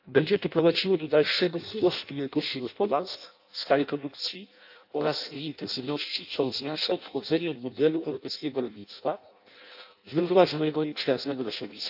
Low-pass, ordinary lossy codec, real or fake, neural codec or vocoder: 5.4 kHz; AAC, 48 kbps; fake; codec, 16 kHz in and 24 kHz out, 0.6 kbps, FireRedTTS-2 codec